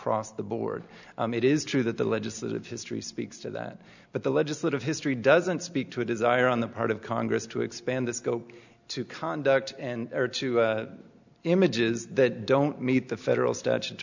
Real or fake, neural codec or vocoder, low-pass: real; none; 7.2 kHz